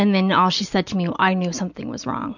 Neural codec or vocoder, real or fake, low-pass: none; real; 7.2 kHz